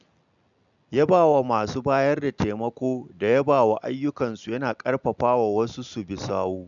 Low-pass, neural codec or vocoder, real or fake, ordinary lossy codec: 7.2 kHz; none; real; none